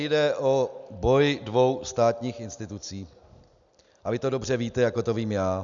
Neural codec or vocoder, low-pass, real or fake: none; 7.2 kHz; real